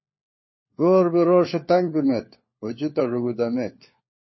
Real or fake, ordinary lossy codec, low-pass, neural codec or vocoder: fake; MP3, 24 kbps; 7.2 kHz; codec, 16 kHz, 4 kbps, FunCodec, trained on LibriTTS, 50 frames a second